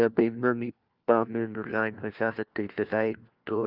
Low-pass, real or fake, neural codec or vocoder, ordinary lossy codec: 5.4 kHz; fake; codec, 16 kHz, 1 kbps, FunCodec, trained on LibriTTS, 50 frames a second; Opus, 32 kbps